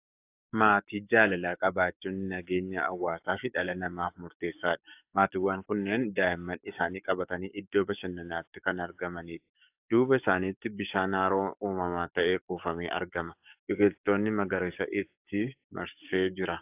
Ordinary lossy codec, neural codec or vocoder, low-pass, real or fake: AAC, 32 kbps; codec, 44.1 kHz, 7.8 kbps, DAC; 3.6 kHz; fake